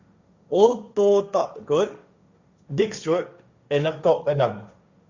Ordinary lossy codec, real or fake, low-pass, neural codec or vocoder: Opus, 64 kbps; fake; 7.2 kHz; codec, 16 kHz, 1.1 kbps, Voila-Tokenizer